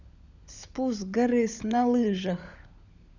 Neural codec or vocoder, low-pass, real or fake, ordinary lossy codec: codec, 16 kHz, 16 kbps, FunCodec, trained on LibriTTS, 50 frames a second; 7.2 kHz; fake; none